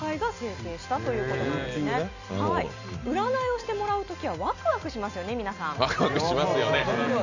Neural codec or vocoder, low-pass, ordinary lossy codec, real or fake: none; 7.2 kHz; none; real